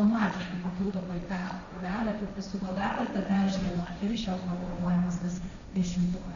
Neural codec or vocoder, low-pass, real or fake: codec, 16 kHz, 1.1 kbps, Voila-Tokenizer; 7.2 kHz; fake